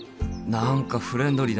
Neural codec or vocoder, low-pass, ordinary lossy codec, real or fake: none; none; none; real